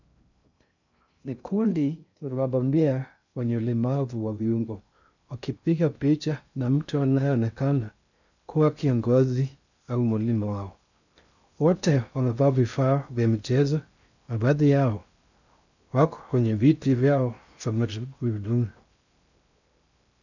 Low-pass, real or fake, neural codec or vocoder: 7.2 kHz; fake; codec, 16 kHz in and 24 kHz out, 0.6 kbps, FocalCodec, streaming, 2048 codes